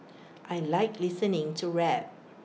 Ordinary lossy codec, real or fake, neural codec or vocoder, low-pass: none; real; none; none